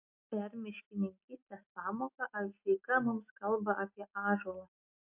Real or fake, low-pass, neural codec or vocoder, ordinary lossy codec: real; 3.6 kHz; none; MP3, 32 kbps